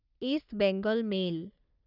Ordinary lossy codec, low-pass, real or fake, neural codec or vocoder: none; 5.4 kHz; fake; codec, 44.1 kHz, 3.4 kbps, Pupu-Codec